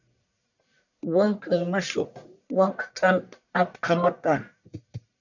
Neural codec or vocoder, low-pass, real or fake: codec, 44.1 kHz, 1.7 kbps, Pupu-Codec; 7.2 kHz; fake